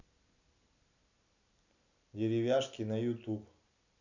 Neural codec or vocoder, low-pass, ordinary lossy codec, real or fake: none; 7.2 kHz; none; real